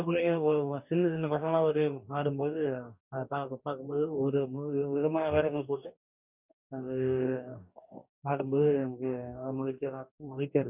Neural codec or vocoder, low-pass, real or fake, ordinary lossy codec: codec, 44.1 kHz, 2.6 kbps, DAC; 3.6 kHz; fake; none